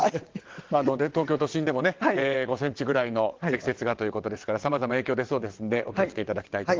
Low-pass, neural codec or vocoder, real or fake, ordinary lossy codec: 7.2 kHz; vocoder, 22.05 kHz, 80 mel bands, WaveNeXt; fake; Opus, 16 kbps